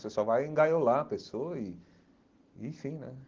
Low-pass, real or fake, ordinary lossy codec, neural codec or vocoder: 7.2 kHz; real; Opus, 16 kbps; none